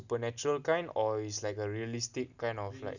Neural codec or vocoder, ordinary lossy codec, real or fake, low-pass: none; none; real; 7.2 kHz